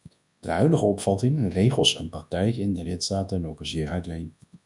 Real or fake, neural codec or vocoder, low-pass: fake; codec, 24 kHz, 0.9 kbps, WavTokenizer, large speech release; 10.8 kHz